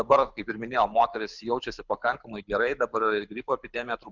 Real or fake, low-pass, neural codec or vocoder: fake; 7.2 kHz; codec, 24 kHz, 6 kbps, HILCodec